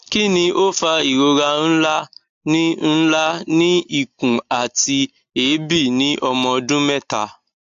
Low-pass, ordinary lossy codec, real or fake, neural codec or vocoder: 7.2 kHz; AAC, 64 kbps; real; none